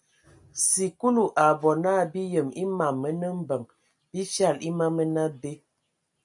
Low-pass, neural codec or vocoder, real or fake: 10.8 kHz; none; real